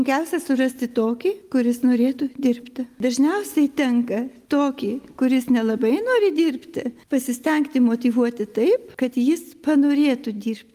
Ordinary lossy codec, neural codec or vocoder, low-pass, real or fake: Opus, 32 kbps; none; 14.4 kHz; real